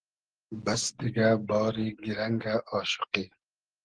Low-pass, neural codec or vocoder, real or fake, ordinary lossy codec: 9.9 kHz; none; real; Opus, 16 kbps